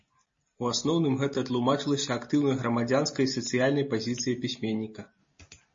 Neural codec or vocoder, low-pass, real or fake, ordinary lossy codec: none; 7.2 kHz; real; MP3, 32 kbps